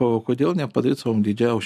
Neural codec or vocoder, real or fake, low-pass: none; real; 14.4 kHz